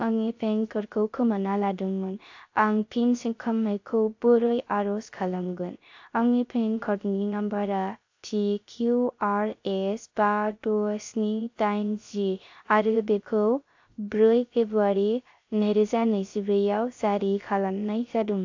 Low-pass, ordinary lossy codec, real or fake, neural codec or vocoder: 7.2 kHz; AAC, 48 kbps; fake; codec, 16 kHz, 0.3 kbps, FocalCodec